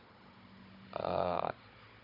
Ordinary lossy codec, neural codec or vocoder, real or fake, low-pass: none; codec, 16 kHz, 16 kbps, FunCodec, trained on Chinese and English, 50 frames a second; fake; 5.4 kHz